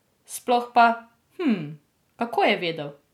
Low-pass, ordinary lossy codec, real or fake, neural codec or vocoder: 19.8 kHz; none; real; none